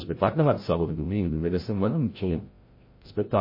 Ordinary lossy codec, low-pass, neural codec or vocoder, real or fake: MP3, 24 kbps; 5.4 kHz; codec, 16 kHz, 0.5 kbps, FreqCodec, larger model; fake